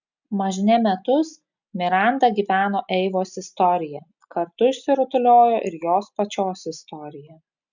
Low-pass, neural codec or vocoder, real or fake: 7.2 kHz; none; real